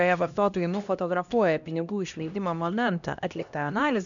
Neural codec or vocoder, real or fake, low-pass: codec, 16 kHz, 1 kbps, X-Codec, HuBERT features, trained on LibriSpeech; fake; 7.2 kHz